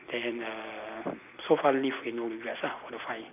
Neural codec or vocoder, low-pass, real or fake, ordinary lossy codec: none; 3.6 kHz; real; none